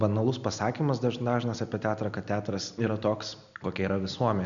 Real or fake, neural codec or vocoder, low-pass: real; none; 7.2 kHz